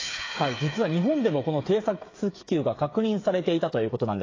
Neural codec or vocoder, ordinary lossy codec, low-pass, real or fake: codec, 16 kHz, 8 kbps, FreqCodec, smaller model; AAC, 32 kbps; 7.2 kHz; fake